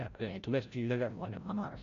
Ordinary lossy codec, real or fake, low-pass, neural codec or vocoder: none; fake; 7.2 kHz; codec, 16 kHz, 0.5 kbps, FreqCodec, larger model